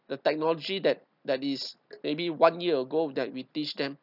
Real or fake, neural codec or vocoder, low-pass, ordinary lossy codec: real; none; 5.4 kHz; none